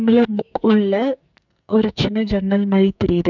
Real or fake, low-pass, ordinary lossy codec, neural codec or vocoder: fake; 7.2 kHz; none; codec, 44.1 kHz, 2.6 kbps, SNAC